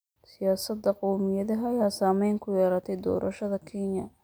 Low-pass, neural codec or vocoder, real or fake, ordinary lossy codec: none; none; real; none